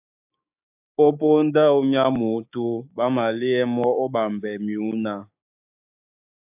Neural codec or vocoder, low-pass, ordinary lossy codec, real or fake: codec, 16 kHz, 6 kbps, DAC; 3.6 kHz; AAC, 32 kbps; fake